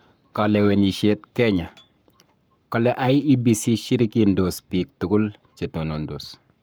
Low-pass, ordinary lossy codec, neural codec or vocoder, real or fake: none; none; codec, 44.1 kHz, 7.8 kbps, Pupu-Codec; fake